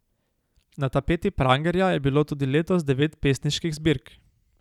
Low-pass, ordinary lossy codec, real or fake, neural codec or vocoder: 19.8 kHz; none; real; none